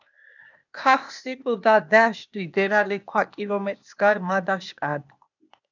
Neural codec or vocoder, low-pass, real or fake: codec, 16 kHz, 0.8 kbps, ZipCodec; 7.2 kHz; fake